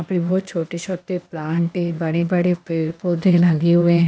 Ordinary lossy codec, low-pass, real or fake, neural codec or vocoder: none; none; fake; codec, 16 kHz, 0.8 kbps, ZipCodec